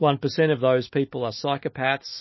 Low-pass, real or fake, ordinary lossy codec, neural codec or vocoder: 7.2 kHz; fake; MP3, 24 kbps; autoencoder, 48 kHz, 128 numbers a frame, DAC-VAE, trained on Japanese speech